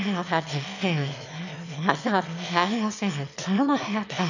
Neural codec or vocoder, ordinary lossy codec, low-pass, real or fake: autoencoder, 22.05 kHz, a latent of 192 numbers a frame, VITS, trained on one speaker; none; 7.2 kHz; fake